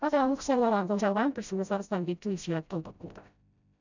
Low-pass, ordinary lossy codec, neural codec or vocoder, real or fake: 7.2 kHz; none; codec, 16 kHz, 0.5 kbps, FreqCodec, smaller model; fake